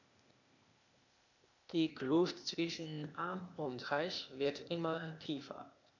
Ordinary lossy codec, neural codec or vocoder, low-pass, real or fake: none; codec, 16 kHz, 0.8 kbps, ZipCodec; 7.2 kHz; fake